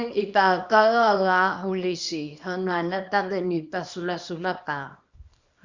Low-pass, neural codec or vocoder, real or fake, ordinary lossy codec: 7.2 kHz; codec, 24 kHz, 0.9 kbps, WavTokenizer, small release; fake; Opus, 64 kbps